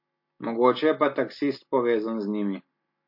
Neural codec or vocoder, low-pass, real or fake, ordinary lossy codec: none; 5.4 kHz; real; MP3, 32 kbps